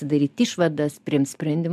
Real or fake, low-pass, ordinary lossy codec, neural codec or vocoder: real; 14.4 kHz; MP3, 96 kbps; none